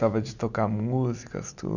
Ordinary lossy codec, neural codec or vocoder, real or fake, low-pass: none; none; real; 7.2 kHz